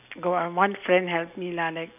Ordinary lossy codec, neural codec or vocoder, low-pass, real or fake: none; none; 3.6 kHz; real